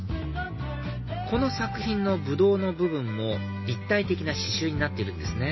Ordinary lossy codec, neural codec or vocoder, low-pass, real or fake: MP3, 24 kbps; none; 7.2 kHz; real